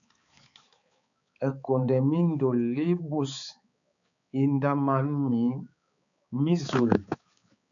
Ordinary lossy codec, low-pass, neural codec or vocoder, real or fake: MP3, 96 kbps; 7.2 kHz; codec, 16 kHz, 4 kbps, X-Codec, HuBERT features, trained on balanced general audio; fake